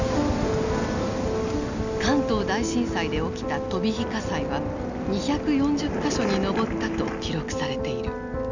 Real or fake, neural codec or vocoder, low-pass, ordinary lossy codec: real; none; 7.2 kHz; none